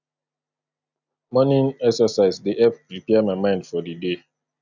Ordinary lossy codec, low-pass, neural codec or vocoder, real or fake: none; 7.2 kHz; none; real